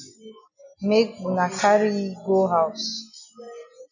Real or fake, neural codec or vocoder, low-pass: real; none; 7.2 kHz